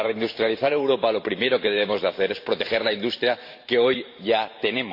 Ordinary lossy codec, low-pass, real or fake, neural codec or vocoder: MP3, 32 kbps; 5.4 kHz; fake; vocoder, 44.1 kHz, 128 mel bands every 512 samples, BigVGAN v2